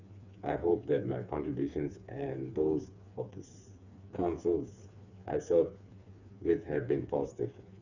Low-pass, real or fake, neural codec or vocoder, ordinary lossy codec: 7.2 kHz; fake; codec, 16 kHz, 4 kbps, FreqCodec, smaller model; none